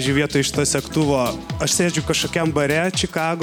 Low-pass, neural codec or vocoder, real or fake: 19.8 kHz; none; real